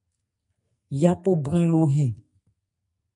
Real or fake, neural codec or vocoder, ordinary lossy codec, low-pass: fake; codec, 44.1 kHz, 2.6 kbps, SNAC; MP3, 64 kbps; 10.8 kHz